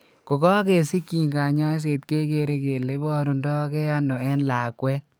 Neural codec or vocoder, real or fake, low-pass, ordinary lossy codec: codec, 44.1 kHz, 7.8 kbps, DAC; fake; none; none